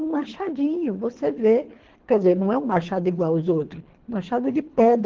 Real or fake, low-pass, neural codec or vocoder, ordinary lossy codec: fake; 7.2 kHz; codec, 24 kHz, 3 kbps, HILCodec; Opus, 16 kbps